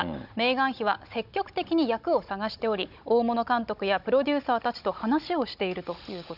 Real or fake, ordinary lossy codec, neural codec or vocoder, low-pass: fake; none; codec, 16 kHz, 16 kbps, FunCodec, trained on Chinese and English, 50 frames a second; 5.4 kHz